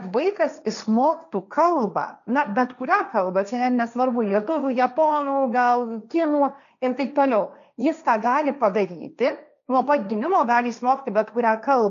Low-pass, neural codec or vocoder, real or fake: 7.2 kHz; codec, 16 kHz, 1.1 kbps, Voila-Tokenizer; fake